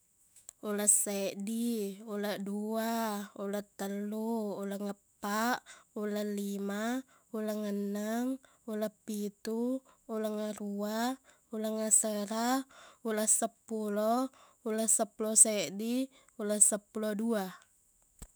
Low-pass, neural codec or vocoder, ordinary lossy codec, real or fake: none; autoencoder, 48 kHz, 128 numbers a frame, DAC-VAE, trained on Japanese speech; none; fake